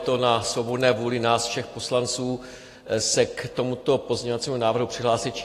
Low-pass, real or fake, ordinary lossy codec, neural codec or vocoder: 14.4 kHz; real; AAC, 48 kbps; none